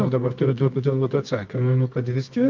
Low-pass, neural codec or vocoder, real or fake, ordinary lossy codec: 7.2 kHz; codec, 24 kHz, 0.9 kbps, WavTokenizer, medium music audio release; fake; Opus, 24 kbps